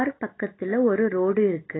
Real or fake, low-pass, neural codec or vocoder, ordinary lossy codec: real; 7.2 kHz; none; AAC, 16 kbps